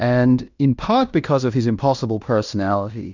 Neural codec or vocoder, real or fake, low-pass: codec, 16 kHz in and 24 kHz out, 0.9 kbps, LongCat-Audio-Codec, fine tuned four codebook decoder; fake; 7.2 kHz